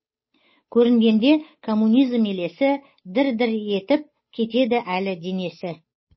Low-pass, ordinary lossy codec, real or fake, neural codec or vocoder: 7.2 kHz; MP3, 24 kbps; fake; codec, 16 kHz, 8 kbps, FunCodec, trained on Chinese and English, 25 frames a second